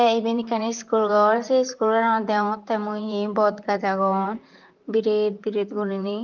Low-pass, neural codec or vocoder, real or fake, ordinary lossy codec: 7.2 kHz; vocoder, 44.1 kHz, 128 mel bands, Pupu-Vocoder; fake; Opus, 24 kbps